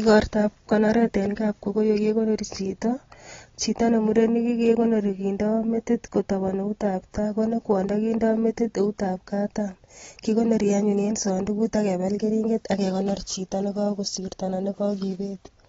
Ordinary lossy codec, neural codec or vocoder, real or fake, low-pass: AAC, 24 kbps; none; real; 7.2 kHz